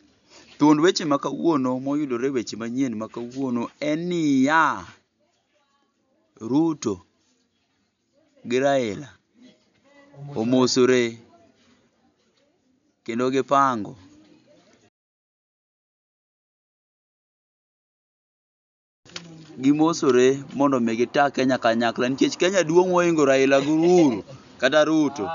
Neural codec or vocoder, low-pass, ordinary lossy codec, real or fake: none; 7.2 kHz; none; real